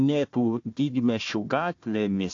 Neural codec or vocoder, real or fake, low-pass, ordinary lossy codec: codec, 16 kHz, 1 kbps, FunCodec, trained on Chinese and English, 50 frames a second; fake; 7.2 kHz; AAC, 48 kbps